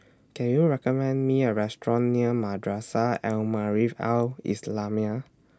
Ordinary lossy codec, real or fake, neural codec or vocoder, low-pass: none; real; none; none